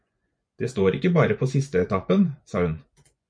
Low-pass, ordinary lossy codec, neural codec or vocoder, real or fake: 9.9 kHz; MP3, 64 kbps; vocoder, 24 kHz, 100 mel bands, Vocos; fake